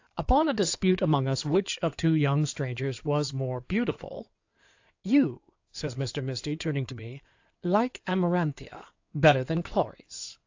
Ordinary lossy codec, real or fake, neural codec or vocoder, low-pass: AAC, 48 kbps; fake; codec, 16 kHz in and 24 kHz out, 2.2 kbps, FireRedTTS-2 codec; 7.2 kHz